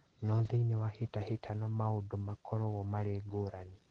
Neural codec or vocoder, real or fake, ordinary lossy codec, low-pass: none; real; Opus, 16 kbps; 9.9 kHz